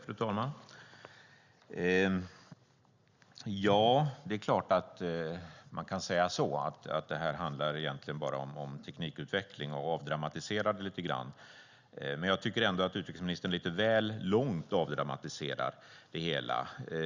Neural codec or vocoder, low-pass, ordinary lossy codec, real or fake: none; 7.2 kHz; Opus, 64 kbps; real